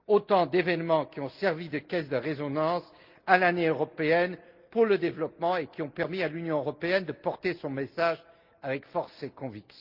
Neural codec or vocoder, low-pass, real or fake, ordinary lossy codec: none; 5.4 kHz; real; Opus, 32 kbps